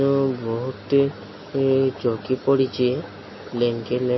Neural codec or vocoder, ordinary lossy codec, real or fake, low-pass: none; MP3, 24 kbps; real; 7.2 kHz